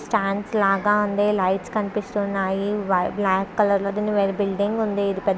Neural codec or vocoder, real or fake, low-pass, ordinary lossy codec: none; real; none; none